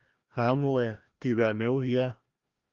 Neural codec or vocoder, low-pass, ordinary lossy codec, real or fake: codec, 16 kHz, 1 kbps, FreqCodec, larger model; 7.2 kHz; Opus, 32 kbps; fake